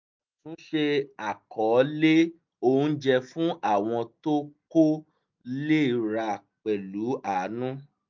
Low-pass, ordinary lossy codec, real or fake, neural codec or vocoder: 7.2 kHz; MP3, 64 kbps; real; none